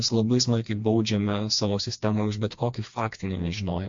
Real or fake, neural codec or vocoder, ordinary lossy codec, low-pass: fake; codec, 16 kHz, 2 kbps, FreqCodec, smaller model; MP3, 48 kbps; 7.2 kHz